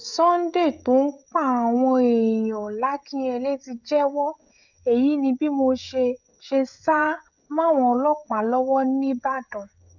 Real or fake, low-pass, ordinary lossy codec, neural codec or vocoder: fake; 7.2 kHz; none; codec, 16 kHz, 16 kbps, FreqCodec, smaller model